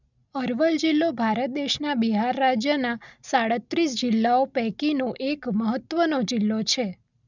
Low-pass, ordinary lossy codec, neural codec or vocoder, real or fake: 7.2 kHz; none; none; real